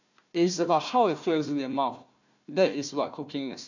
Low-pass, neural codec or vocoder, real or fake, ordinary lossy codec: 7.2 kHz; codec, 16 kHz, 1 kbps, FunCodec, trained on Chinese and English, 50 frames a second; fake; none